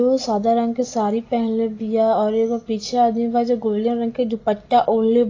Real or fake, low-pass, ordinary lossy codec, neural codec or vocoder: real; 7.2 kHz; AAC, 32 kbps; none